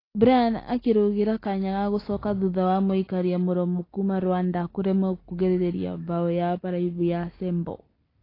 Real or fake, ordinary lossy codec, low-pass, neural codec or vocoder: real; AAC, 24 kbps; 5.4 kHz; none